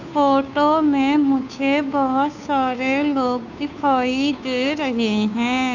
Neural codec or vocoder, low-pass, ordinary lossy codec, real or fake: codec, 16 kHz, 2 kbps, FunCodec, trained on Chinese and English, 25 frames a second; 7.2 kHz; none; fake